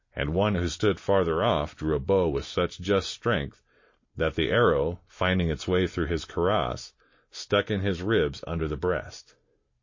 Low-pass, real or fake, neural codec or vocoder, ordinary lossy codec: 7.2 kHz; real; none; MP3, 32 kbps